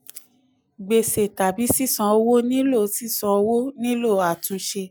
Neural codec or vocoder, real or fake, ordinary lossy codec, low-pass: none; real; none; none